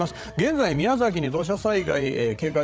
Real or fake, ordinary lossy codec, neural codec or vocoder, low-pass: fake; none; codec, 16 kHz, 8 kbps, FreqCodec, larger model; none